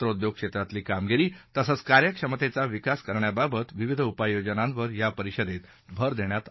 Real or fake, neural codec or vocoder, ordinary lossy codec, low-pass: fake; codec, 16 kHz, 16 kbps, FunCodec, trained on Chinese and English, 50 frames a second; MP3, 24 kbps; 7.2 kHz